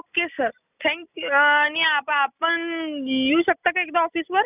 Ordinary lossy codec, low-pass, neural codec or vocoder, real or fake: none; 3.6 kHz; none; real